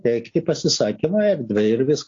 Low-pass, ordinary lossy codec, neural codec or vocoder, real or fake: 7.2 kHz; AAC, 48 kbps; none; real